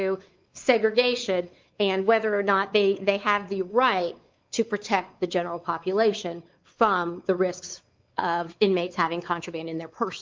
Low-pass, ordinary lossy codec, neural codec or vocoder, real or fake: 7.2 kHz; Opus, 32 kbps; codec, 16 kHz, 4 kbps, X-Codec, WavLM features, trained on Multilingual LibriSpeech; fake